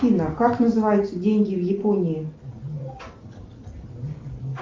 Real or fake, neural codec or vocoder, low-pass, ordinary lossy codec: real; none; 7.2 kHz; Opus, 32 kbps